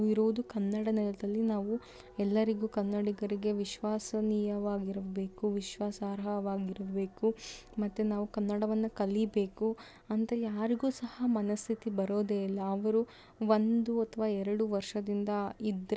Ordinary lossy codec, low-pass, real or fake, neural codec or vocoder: none; none; real; none